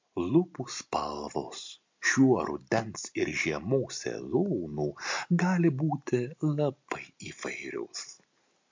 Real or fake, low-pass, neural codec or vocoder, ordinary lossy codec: real; 7.2 kHz; none; MP3, 48 kbps